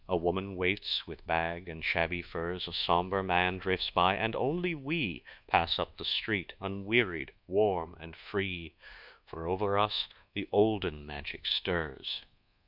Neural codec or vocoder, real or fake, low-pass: codec, 24 kHz, 1.2 kbps, DualCodec; fake; 5.4 kHz